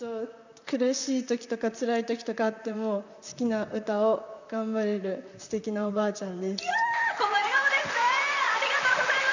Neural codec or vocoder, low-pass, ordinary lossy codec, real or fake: vocoder, 44.1 kHz, 128 mel bands, Pupu-Vocoder; 7.2 kHz; none; fake